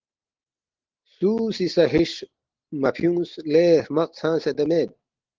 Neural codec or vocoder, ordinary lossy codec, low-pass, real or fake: none; Opus, 16 kbps; 7.2 kHz; real